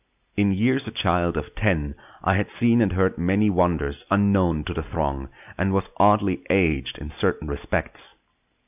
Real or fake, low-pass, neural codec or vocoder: real; 3.6 kHz; none